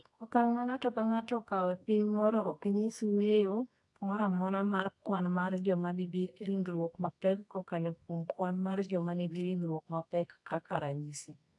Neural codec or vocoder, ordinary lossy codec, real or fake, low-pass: codec, 24 kHz, 0.9 kbps, WavTokenizer, medium music audio release; AAC, 64 kbps; fake; 10.8 kHz